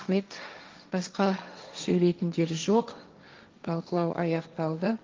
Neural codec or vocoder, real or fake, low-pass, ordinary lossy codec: codec, 16 kHz, 1.1 kbps, Voila-Tokenizer; fake; 7.2 kHz; Opus, 32 kbps